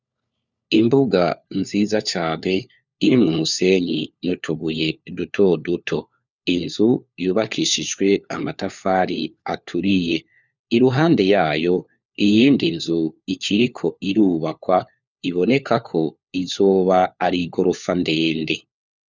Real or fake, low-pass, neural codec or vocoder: fake; 7.2 kHz; codec, 16 kHz, 4 kbps, FunCodec, trained on LibriTTS, 50 frames a second